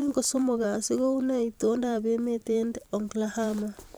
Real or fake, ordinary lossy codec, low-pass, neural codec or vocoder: fake; none; none; vocoder, 44.1 kHz, 128 mel bands every 512 samples, BigVGAN v2